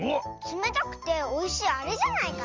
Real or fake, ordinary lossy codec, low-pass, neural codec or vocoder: real; Opus, 32 kbps; 7.2 kHz; none